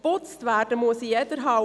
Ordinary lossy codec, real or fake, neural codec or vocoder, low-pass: none; real; none; none